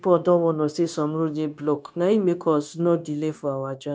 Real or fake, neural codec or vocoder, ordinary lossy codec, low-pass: fake; codec, 16 kHz, 0.9 kbps, LongCat-Audio-Codec; none; none